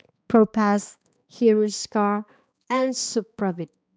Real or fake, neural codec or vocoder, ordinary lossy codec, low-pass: fake; codec, 16 kHz, 2 kbps, X-Codec, HuBERT features, trained on balanced general audio; none; none